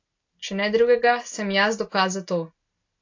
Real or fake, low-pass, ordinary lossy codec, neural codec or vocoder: real; 7.2 kHz; AAC, 48 kbps; none